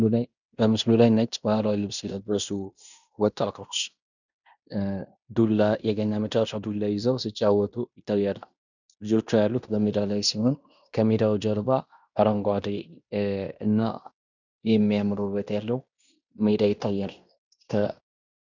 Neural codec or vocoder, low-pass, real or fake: codec, 16 kHz in and 24 kHz out, 0.9 kbps, LongCat-Audio-Codec, fine tuned four codebook decoder; 7.2 kHz; fake